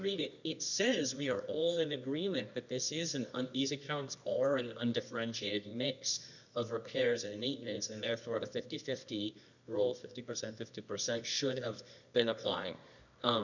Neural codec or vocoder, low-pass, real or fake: codec, 24 kHz, 0.9 kbps, WavTokenizer, medium music audio release; 7.2 kHz; fake